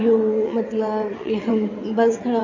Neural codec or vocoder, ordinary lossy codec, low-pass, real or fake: vocoder, 22.05 kHz, 80 mel bands, WaveNeXt; MP3, 32 kbps; 7.2 kHz; fake